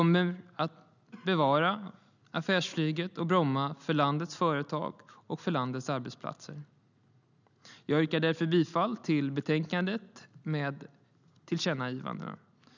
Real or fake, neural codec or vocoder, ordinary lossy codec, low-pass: real; none; none; 7.2 kHz